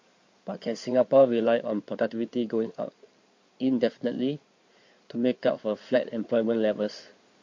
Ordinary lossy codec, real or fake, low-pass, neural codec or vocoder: MP3, 48 kbps; fake; 7.2 kHz; codec, 16 kHz in and 24 kHz out, 2.2 kbps, FireRedTTS-2 codec